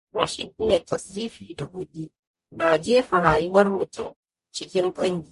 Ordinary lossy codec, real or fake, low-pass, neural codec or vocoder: MP3, 48 kbps; fake; 14.4 kHz; codec, 44.1 kHz, 0.9 kbps, DAC